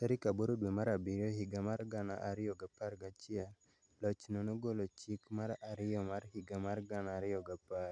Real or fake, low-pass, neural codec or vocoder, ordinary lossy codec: real; 9.9 kHz; none; none